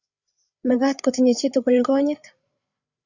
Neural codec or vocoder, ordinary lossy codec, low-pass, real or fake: codec, 16 kHz, 8 kbps, FreqCodec, larger model; Opus, 64 kbps; 7.2 kHz; fake